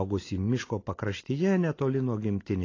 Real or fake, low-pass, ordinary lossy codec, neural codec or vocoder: real; 7.2 kHz; AAC, 32 kbps; none